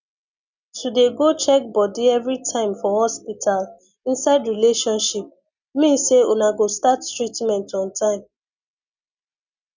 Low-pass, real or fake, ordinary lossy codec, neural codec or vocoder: 7.2 kHz; real; none; none